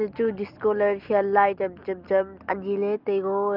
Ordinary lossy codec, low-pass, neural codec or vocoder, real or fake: Opus, 24 kbps; 5.4 kHz; none; real